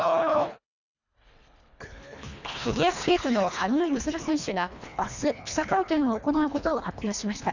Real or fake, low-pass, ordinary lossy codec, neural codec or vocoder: fake; 7.2 kHz; none; codec, 24 kHz, 1.5 kbps, HILCodec